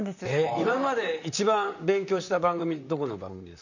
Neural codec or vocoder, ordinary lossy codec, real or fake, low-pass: vocoder, 44.1 kHz, 128 mel bands, Pupu-Vocoder; none; fake; 7.2 kHz